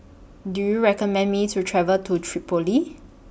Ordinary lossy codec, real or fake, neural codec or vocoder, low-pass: none; real; none; none